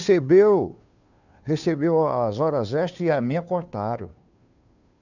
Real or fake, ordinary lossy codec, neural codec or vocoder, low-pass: fake; none; codec, 16 kHz, 2 kbps, FunCodec, trained on Chinese and English, 25 frames a second; 7.2 kHz